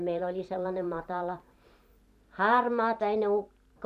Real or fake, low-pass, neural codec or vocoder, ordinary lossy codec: fake; 14.4 kHz; vocoder, 44.1 kHz, 128 mel bands every 512 samples, BigVGAN v2; none